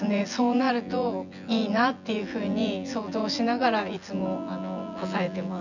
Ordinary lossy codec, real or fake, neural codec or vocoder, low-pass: none; fake; vocoder, 24 kHz, 100 mel bands, Vocos; 7.2 kHz